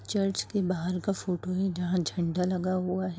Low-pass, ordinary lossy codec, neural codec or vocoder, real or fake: none; none; none; real